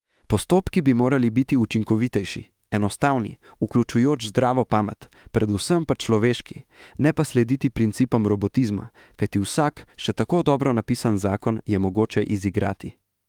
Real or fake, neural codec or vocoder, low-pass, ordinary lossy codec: fake; autoencoder, 48 kHz, 32 numbers a frame, DAC-VAE, trained on Japanese speech; 19.8 kHz; Opus, 32 kbps